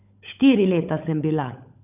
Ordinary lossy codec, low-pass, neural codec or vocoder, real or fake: none; 3.6 kHz; codec, 16 kHz, 16 kbps, FunCodec, trained on LibriTTS, 50 frames a second; fake